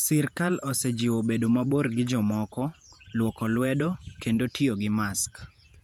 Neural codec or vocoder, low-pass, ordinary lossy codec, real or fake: vocoder, 48 kHz, 128 mel bands, Vocos; 19.8 kHz; none; fake